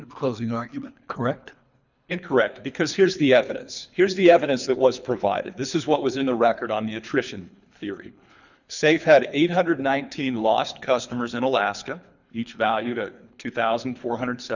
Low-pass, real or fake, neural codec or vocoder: 7.2 kHz; fake; codec, 24 kHz, 3 kbps, HILCodec